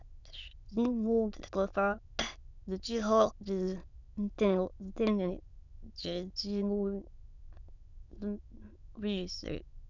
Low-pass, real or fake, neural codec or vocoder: 7.2 kHz; fake; autoencoder, 22.05 kHz, a latent of 192 numbers a frame, VITS, trained on many speakers